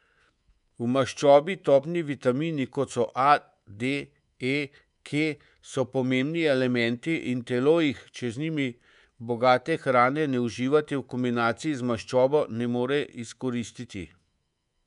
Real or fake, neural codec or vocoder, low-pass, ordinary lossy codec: fake; codec, 24 kHz, 3.1 kbps, DualCodec; 10.8 kHz; none